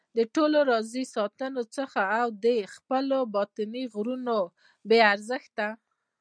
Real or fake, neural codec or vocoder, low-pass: real; none; 9.9 kHz